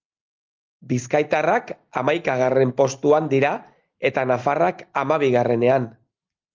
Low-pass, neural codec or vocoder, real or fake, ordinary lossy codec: 7.2 kHz; none; real; Opus, 32 kbps